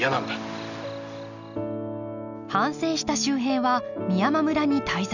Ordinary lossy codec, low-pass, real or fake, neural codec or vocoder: none; 7.2 kHz; real; none